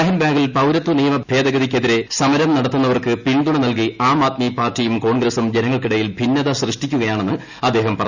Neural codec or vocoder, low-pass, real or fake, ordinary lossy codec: none; 7.2 kHz; real; none